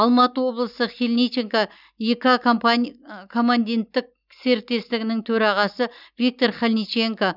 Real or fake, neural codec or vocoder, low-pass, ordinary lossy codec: real; none; 5.4 kHz; none